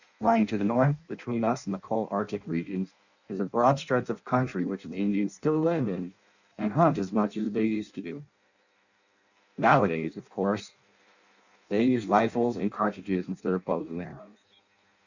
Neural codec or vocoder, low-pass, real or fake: codec, 16 kHz in and 24 kHz out, 0.6 kbps, FireRedTTS-2 codec; 7.2 kHz; fake